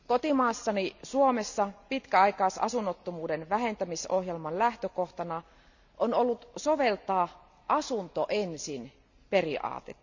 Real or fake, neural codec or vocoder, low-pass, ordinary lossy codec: real; none; 7.2 kHz; none